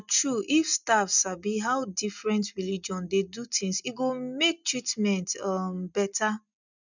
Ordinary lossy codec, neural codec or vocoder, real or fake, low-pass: none; none; real; 7.2 kHz